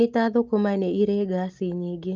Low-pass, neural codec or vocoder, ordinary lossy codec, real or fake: 7.2 kHz; none; Opus, 24 kbps; real